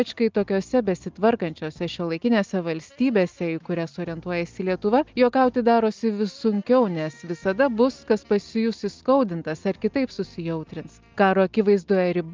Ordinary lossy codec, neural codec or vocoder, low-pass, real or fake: Opus, 24 kbps; none; 7.2 kHz; real